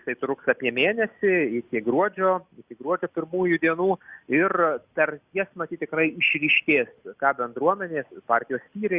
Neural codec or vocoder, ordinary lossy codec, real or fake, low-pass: none; Opus, 64 kbps; real; 3.6 kHz